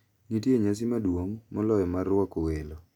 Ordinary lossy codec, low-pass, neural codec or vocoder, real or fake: none; 19.8 kHz; none; real